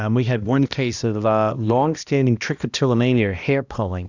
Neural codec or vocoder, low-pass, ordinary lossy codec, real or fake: codec, 16 kHz, 1 kbps, X-Codec, HuBERT features, trained on balanced general audio; 7.2 kHz; Opus, 64 kbps; fake